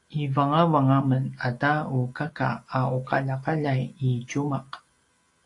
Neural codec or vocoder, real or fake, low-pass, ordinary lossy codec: none; real; 10.8 kHz; AAC, 48 kbps